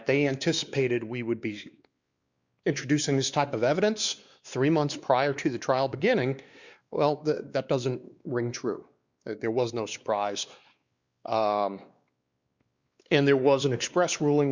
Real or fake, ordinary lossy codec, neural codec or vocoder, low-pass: fake; Opus, 64 kbps; codec, 16 kHz, 2 kbps, X-Codec, WavLM features, trained on Multilingual LibriSpeech; 7.2 kHz